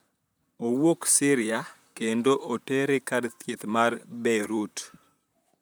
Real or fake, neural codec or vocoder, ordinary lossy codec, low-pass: fake; vocoder, 44.1 kHz, 128 mel bands, Pupu-Vocoder; none; none